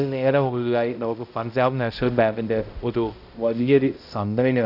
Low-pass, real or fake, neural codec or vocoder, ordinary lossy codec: 5.4 kHz; fake; codec, 16 kHz, 0.5 kbps, X-Codec, HuBERT features, trained on balanced general audio; none